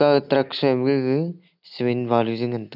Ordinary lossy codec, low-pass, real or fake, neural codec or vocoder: none; 5.4 kHz; real; none